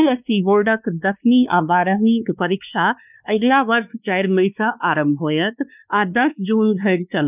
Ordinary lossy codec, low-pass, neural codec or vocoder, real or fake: none; 3.6 kHz; codec, 16 kHz, 2 kbps, X-Codec, WavLM features, trained on Multilingual LibriSpeech; fake